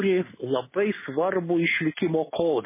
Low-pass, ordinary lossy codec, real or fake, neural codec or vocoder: 3.6 kHz; MP3, 16 kbps; real; none